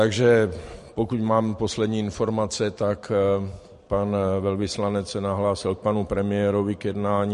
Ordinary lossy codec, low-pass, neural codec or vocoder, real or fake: MP3, 48 kbps; 14.4 kHz; vocoder, 44.1 kHz, 128 mel bands every 512 samples, BigVGAN v2; fake